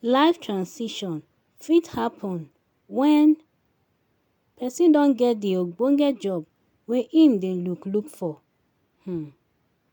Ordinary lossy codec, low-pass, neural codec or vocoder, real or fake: MP3, 96 kbps; 19.8 kHz; none; real